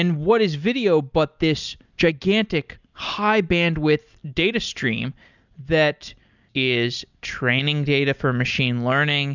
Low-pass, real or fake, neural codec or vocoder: 7.2 kHz; real; none